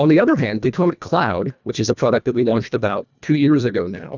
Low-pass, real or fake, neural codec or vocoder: 7.2 kHz; fake; codec, 24 kHz, 1.5 kbps, HILCodec